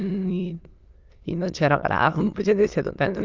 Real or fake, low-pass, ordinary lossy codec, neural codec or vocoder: fake; 7.2 kHz; Opus, 32 kbps; autoencoder, 22.05 kHz, a latent of 192 numbers a frame, VITS, trained on many speakers